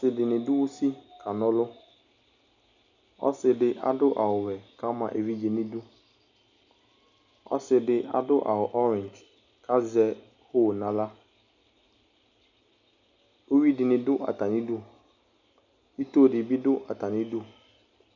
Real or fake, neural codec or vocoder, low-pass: real; none; 7.2 kHz